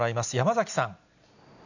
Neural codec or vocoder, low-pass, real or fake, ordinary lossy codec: none; 7.2 kHz; real; none